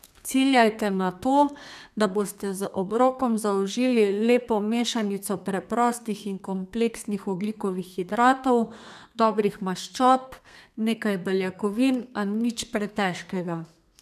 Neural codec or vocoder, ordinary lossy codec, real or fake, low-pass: codec, 32 kHz, 1.9 kbps, SNAC; none; fake; 14.4 kHz